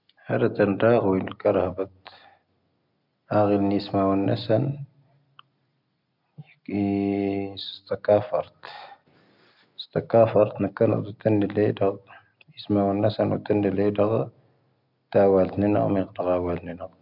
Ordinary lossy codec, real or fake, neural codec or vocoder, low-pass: none; real; none; 5.4 kHz